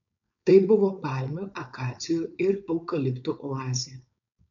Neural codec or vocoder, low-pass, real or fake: codec, 16 kHz, 4.8 kbps, FACodec; 7.2 kHz; fake